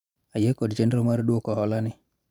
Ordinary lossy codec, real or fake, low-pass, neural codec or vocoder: none; fake; 19.8 kHz; vocoder, 44.1 kHz, 128 mel bands every 512 samples, BigVGAN v2